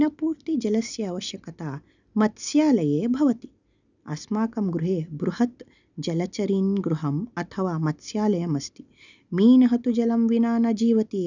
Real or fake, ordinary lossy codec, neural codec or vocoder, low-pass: real; none; none; 7.2 kHz